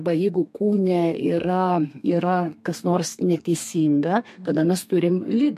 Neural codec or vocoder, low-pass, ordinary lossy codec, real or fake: codec, 32 kHz, 1.9 kbps, SNAC; 14.4 kHz; MP3, 64 kbps; fake